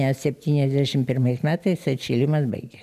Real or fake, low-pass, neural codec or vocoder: real; 14.4 kHz; none